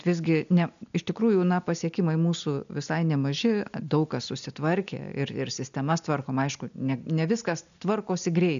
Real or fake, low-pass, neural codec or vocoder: real; 7.2 kHz; none